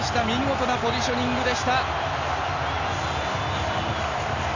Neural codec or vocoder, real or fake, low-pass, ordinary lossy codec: none; real; 7.2 kHz; none